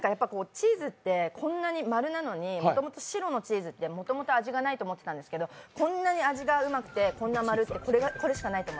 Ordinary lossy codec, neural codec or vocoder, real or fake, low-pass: none; none; real; none